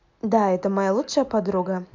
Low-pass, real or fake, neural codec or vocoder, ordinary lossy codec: 7.2 kHz; real; none; MP3, 64 kbps